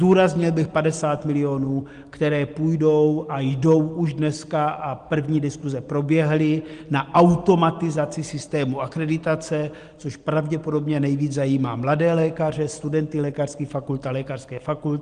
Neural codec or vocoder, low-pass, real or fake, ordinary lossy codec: none; 9.9 kHz; real; Opus, 24 kbps